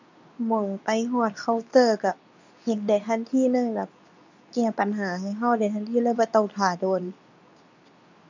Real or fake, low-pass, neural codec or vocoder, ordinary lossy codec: fake; 7.2 kHz; codec, 24 kHz, 0.9 kbps, WavTokenizer, medium speech release version 2; none